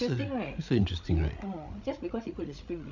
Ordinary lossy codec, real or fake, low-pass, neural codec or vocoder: none; fake; 7.2 kHz; codec, 16 kHz, 16 kbps, FreqCodec, larger model